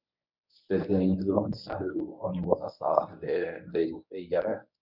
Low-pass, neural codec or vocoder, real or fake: 5.4 kHz; codec, 24 kHz, 0.9 kbps, WavTokenizer, medium speech release version 1; fake